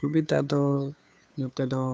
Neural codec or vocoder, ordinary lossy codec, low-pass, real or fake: codec, 16 kHz, 2 kbps, FunCodec, trained on Chinese and English, 25 frames a second; none; none; fake